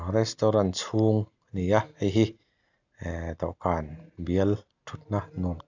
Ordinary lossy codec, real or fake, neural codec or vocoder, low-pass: none; real; none; 7.2 kHz